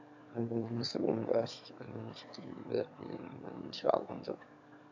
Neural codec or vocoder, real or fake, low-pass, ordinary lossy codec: autoencoder, 22.05 kHz, a latent of 192 numbers a frame, VITS, trained on one speaker; fake; 7.2 kHz; AAC, 48 kbps